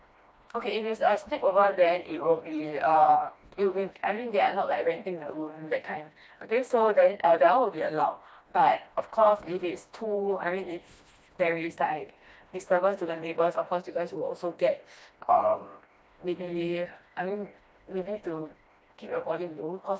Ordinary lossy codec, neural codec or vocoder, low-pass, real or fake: none; codec, 16 kHz, 1 kbps, FreqCodec, smaller model; none; fake